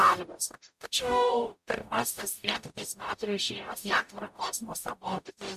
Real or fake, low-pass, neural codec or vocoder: fake; 14.4 kHz; codec, 44.1 kHz, 0.9 kbps, DAC